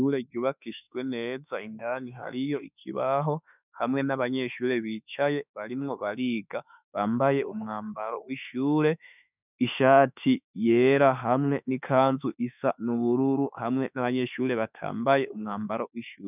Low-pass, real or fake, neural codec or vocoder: 3.6 kHz; fake; autoencoder, 48 kHz, 32 numbers a frame, DAC-VAE, trained on Japanese speech